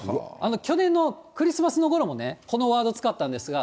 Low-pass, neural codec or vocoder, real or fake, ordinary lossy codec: none; none; real; none